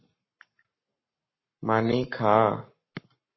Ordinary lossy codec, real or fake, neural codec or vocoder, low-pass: MP3, 24 kbps; fake; codec, 44.1 kHz, 7.8 kbps, Pupu-Codec; 7.2 kHz